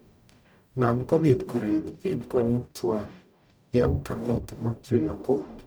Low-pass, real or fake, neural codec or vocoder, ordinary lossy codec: none; fake; codec, 44.1 kHz, 0.9 kbps, DAC; none